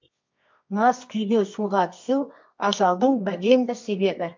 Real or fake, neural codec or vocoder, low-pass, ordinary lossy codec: fake; codec, 24 kHz, 0.9 kbps, WavTokenizer, medium music audio release; 7.2 kHz; MP3, 48 kbps